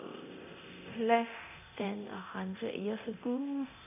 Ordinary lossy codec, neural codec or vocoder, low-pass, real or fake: none; codec, 24 kHz, 0.9 kbps, DualCodec; 3.6 kHz; fake